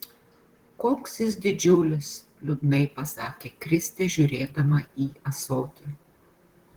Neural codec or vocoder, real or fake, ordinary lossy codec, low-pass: vocoder, 44.1 kHz, 128 mel bands, Pupu-Vocoder; fake; Opus, 16 kbps; 19.8 kHz